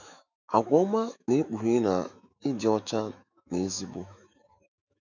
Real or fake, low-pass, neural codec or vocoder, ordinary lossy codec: fake; 7.2 kHz; autoencoder, 48 kHz, 128 numbers a frame, DAC-VAE, trained on Japanese speech; none